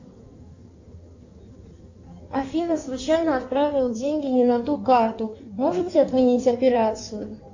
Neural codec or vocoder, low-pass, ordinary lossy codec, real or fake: codec, 16 kHz in and 24 kHz out, 1.1 kbps, FireRedTTS-2 codec; 7.2 kHz; AAC, 48 kbps; fake